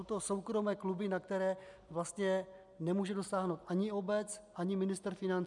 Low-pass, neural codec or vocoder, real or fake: 10.8 kHz; none; real